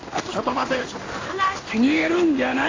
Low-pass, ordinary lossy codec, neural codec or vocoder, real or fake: 7.2 kHz; AAC, 32 kbps; codec, 16 kHz in and 24 kHz out, 1 kbps, XY-Tokenizer; fake